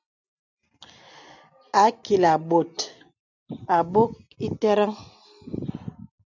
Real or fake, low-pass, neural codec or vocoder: real; 7.2 kHz; none